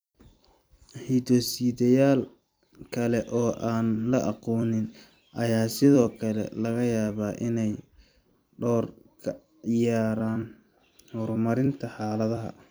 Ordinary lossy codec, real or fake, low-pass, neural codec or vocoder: none; fake; none; vocoder, 44.1 kHz, 128 mel bands every 256 samples, BigVGAN v2